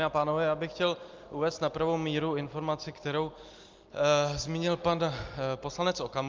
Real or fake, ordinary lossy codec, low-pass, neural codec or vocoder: real; Opus, 32 kbps; 7.2 kHz; none